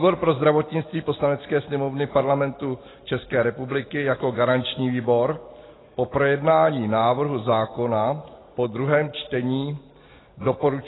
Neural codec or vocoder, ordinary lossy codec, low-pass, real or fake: none; AAC, 16 kbps; 7.2 kHz; real